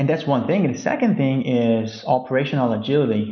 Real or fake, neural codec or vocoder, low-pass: real; none; 7.2 kHz